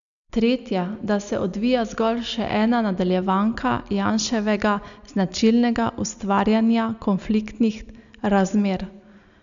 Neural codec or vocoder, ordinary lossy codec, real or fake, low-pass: none; none; real; 7.2 kHz